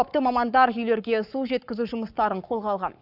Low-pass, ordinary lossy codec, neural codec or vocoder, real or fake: 5.4 kHz; none; codec, 44.1 kHz, 7.8 kbps, Pupu-Codec; fake